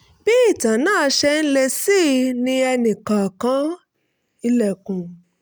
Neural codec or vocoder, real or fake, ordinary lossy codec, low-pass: none; real; none; none